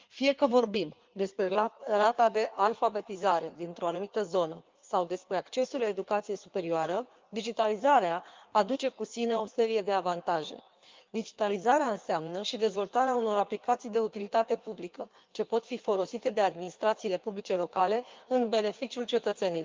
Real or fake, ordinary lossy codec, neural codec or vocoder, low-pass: fake; Opus, 24 kbps; codec, 16 kHz in and 24 kHz out, 1.1 kbps, FireRedTTS-2 codec; 7.2 kHz